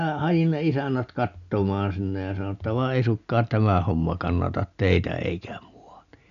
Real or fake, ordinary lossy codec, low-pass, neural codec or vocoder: real; none; 7.2 kHz; none